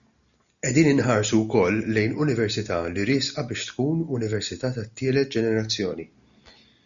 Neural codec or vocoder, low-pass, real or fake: none; 7.2 kHz; real